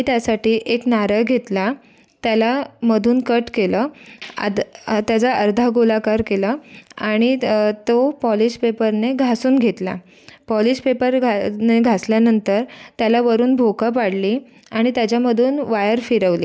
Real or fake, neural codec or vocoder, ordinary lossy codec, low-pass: real; none; none; none